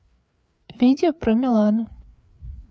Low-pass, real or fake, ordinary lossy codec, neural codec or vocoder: none; fake; none; codec, 16 kHz, 4 kbps, FreqCodec, larger model